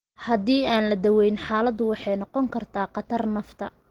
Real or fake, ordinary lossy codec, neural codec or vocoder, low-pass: real; Opus, 16 kbps; none; 19.8 kHz